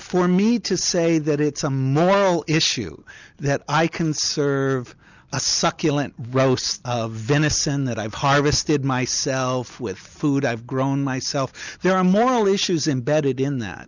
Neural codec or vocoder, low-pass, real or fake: none; 7.2 kHz; real